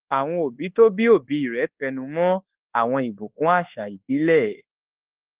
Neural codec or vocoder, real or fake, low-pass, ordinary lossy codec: codec, 24 kHz, 1.2 kbps, DualCodec; fake; 3.6 kHz; Opus, 16 kbps